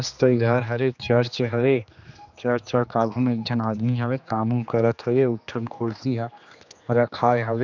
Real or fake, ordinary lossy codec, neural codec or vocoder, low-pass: fake; none; codec, 16 kHz, 2 kbps, X-Codec, HuBERT features, trained on general audio; 7.2 kHz